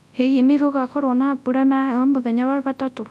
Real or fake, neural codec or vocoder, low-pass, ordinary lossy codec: fake; codec, 24 kHz, 0.9 kbps, WavTokenizer, large speech release; none; none